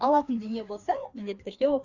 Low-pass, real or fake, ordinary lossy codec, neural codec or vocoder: 7.2 kHz; fake; Opus, 64 kbps; codec, 16 kHz, 2 kbps, FreqCodec, larger model